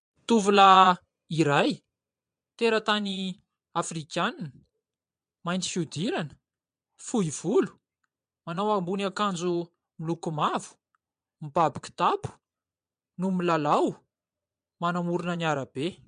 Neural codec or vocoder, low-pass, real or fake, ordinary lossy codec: vocoder, 22.05 kHz, 80 mel bands, Vocos; 9.9 kHz; fake; MP3, 64 kbps